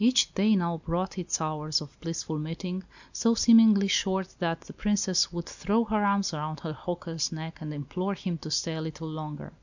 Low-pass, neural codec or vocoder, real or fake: 7.2 kHz; none; real